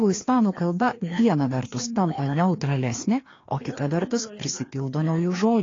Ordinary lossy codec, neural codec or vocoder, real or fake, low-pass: AAC, 32 kbps; codec, 16 kHz, 2 kbps, FreqCodec, larger model; fake; 7.2 kHz